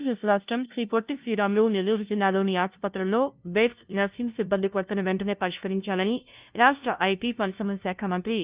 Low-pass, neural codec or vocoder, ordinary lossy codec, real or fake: 3.6 kHz; codec, 16 kHz, 0.5 kbps, FunCodec, trained on LibriTTS, 25 frames a second; Opus, 32 kbps; fake